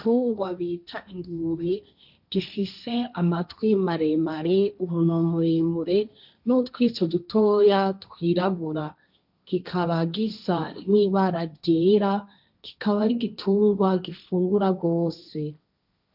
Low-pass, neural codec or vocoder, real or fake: 5.4 kHz; codec, 16 kHz, 1.1 kbps, Voila-Tokenizer; fake